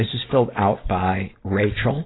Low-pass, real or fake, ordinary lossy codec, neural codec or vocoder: 7.2 kHz; real; AAC, 16 kbps; none